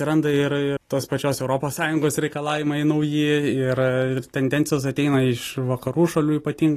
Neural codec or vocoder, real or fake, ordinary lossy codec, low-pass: none; real; AAC, 48 kbps; 14.4 kHz